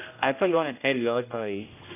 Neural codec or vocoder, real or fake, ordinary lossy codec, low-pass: codec, 16 kHz, 0.5 kbps, X-Codec, HuBERT features, trained on general audio; fake; none; 3.6 kHz